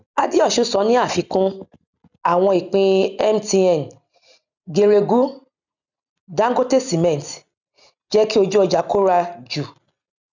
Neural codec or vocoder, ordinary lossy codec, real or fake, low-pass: none; none; real; 7.2 kHz